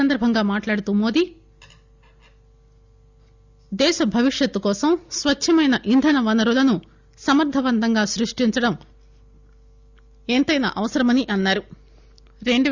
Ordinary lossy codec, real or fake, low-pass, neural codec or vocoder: Opus, 64 kbps; real; 7.2 kHz; none